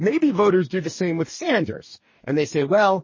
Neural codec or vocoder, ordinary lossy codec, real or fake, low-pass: codec, 44.1 kHz, 2.6 kbps, DAC; MP3, 32 kbps; fake; 7.2 kHz